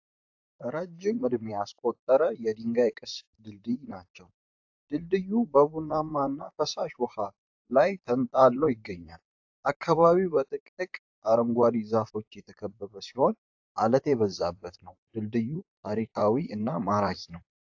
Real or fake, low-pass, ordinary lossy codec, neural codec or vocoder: fake; 7.2 kHz; AAC, 48 kbps; vocoder, 44.1 kHz, 128 mel bands, Pupu-Vocoder